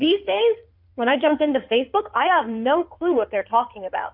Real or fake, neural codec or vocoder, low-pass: fake; codec, 16 kHz in and 24 kHz out, 2.2 kbps, FireRedTTS-2 codec; 5.4 kHz